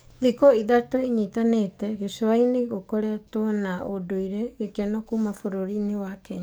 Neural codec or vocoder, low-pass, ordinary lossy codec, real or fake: codec, 44.1 kHz, 7.8 kbps, DAC; none; none; fake